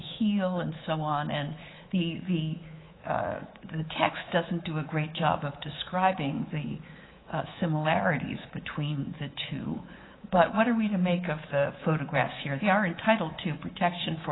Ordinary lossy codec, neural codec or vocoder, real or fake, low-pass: AAC, 16 kbps; codec, 24 kHz, 3.1 kbps, DualCodec; fake; 7.2 kHz